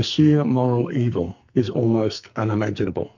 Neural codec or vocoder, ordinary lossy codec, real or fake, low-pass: codec, 44.1 kHz, 2.6 kbps, SNAC; MP3, 64 kbps; fake; 7.2 kHz